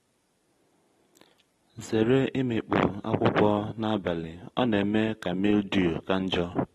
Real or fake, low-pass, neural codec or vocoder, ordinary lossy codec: real; 19.8 kHz; none; AAC, 32 kbps